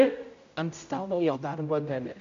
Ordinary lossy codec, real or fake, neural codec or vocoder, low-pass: MP3, 64 kbps; fake; codec, 16 kHz, 0.5 kbps, X-Codec, HuBERT features, trained on general audio; 7.2 kHz